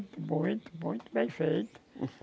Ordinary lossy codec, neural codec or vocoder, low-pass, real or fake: none; none; none; real